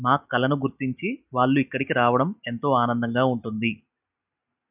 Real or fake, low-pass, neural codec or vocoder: real; 3.6 kHz; none